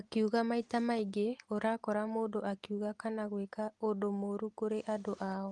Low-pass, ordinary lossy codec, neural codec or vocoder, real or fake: 10.8 kHz; Opus, 24 kbps; none; real